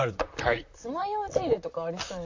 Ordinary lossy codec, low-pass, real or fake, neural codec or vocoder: AAC, 48 kbps; 7.2 kHz; fake; vocoder, 44.1 kHz, 128 mel bands, Pupu-Vocoder